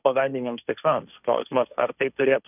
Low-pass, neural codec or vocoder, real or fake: 3.6 kHz; codec, 16 kHz, 1.1 kbps, Voila-Tokenizer; fake